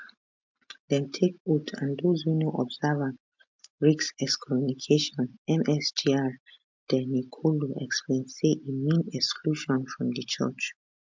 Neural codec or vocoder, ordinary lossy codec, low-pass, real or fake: none; MP3, 64 kbps; 7.2 kHz; real